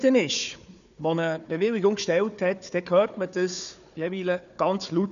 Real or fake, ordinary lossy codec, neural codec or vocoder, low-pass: fake; none; codec, 16 kHz, 4 kbps, FunCodec, trained on Chinese and English, 50 frames a second; 7.2 kHz